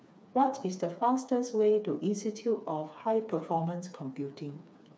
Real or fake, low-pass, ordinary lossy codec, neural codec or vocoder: fake; none; none; codec, 16 kHz, 4 kbps, FreqCodec, smaller model